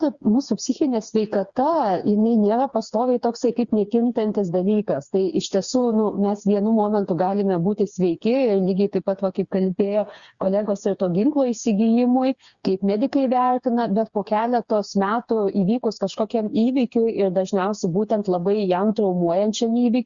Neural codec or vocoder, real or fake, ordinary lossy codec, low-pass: codec, 16 kHz, 4 kbps, FreqCodec, smaller model; fake; Opus, 64 kbps; 7.2 kHz